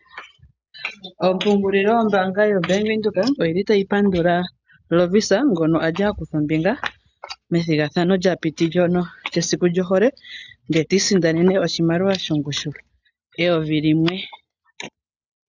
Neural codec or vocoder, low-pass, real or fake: none; 7.2 kHz; real